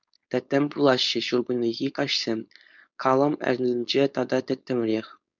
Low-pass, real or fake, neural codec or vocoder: 7.2 kHz; fake; codec, 16 kHz, 4.8 kbps, FACodec